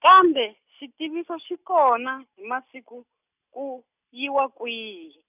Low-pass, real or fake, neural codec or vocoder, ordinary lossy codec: 3.6 kHz; real; none; none